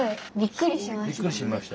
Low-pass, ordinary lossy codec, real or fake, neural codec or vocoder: none; none; real; none